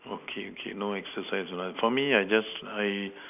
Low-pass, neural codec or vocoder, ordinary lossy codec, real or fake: 3.6 kHz; none; none; real